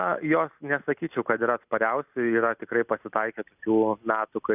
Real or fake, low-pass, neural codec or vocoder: real; 3.6 kHz; none